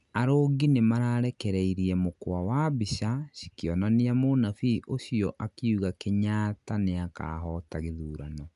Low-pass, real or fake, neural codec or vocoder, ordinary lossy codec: 10.8 kHz; real; none; MP3, 96 kbps